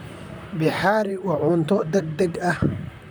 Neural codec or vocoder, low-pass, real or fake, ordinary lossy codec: vocoder, 44.1 kHz, 128 mel bands, Pupu-Vocoder; none; fake; none